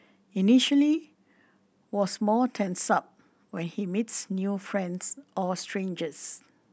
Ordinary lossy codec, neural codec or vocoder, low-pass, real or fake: none; none; none; real